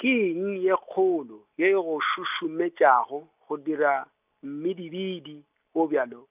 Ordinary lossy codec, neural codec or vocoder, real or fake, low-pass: none; none; real; 3.6 kHz